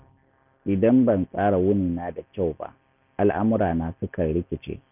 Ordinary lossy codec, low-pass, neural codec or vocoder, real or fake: none; 3.6 kHz; none; real